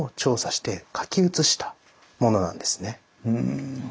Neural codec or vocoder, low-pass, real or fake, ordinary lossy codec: none; none; real; none